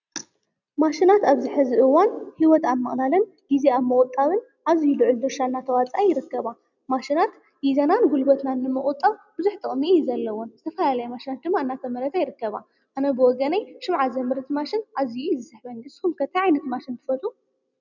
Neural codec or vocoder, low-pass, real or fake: none; 7.2 kHz; real